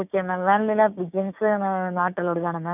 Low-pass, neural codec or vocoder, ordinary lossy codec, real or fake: 3.6 kHz; codec, 16 kHz, 6 kbps, DAC; none; fake